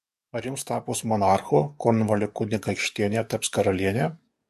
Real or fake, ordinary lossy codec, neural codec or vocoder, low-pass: fake; MP3, 64 kbps; codec, 44.1 kHz, 7.8 kbps, DAC; 14.4 kHz